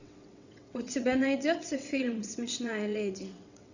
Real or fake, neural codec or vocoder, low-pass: fake; vocoder, 22.05 kHz, 80 mel bands, WaveNeXt; 7.2 kHz